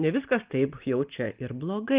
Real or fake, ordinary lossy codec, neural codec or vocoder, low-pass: real; Opus, 32 kbps; none; 3.6 kHz